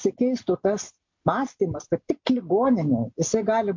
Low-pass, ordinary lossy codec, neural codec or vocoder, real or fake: 7.2 kHz; MP3, 48 kbps; none; real